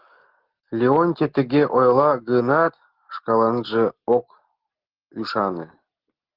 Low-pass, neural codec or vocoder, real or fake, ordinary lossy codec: 5.4 kHz; none; real; Opus, 16 kbps